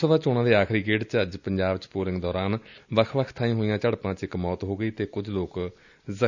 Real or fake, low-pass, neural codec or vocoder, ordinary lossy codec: real; 7.2 kHz; none; none